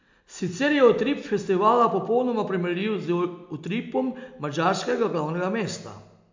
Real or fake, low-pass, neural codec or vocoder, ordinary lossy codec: real; 7.2 kHz; none; MP3, 64 kbps